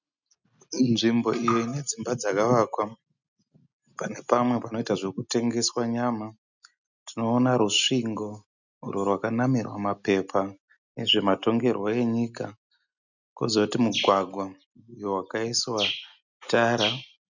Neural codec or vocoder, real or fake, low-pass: none; real; 7.2 kHz